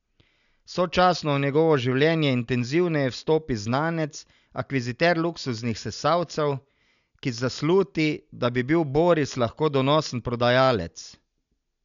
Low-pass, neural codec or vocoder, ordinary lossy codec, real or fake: 7.2 kHz; none; none; real